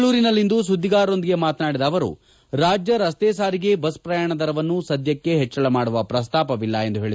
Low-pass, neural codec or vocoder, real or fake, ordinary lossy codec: none; none; real; none